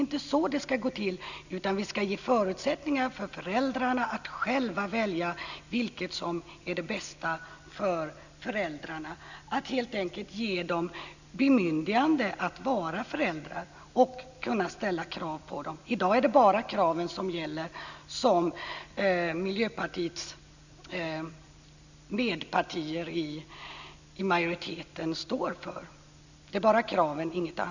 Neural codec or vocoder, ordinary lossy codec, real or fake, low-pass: none; none; real; 7.2 kHz